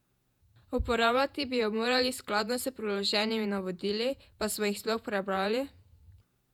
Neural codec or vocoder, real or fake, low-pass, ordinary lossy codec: vocoder, 48 kHz, 128 mel bands, Vocos; fake; 19.8 kHz; none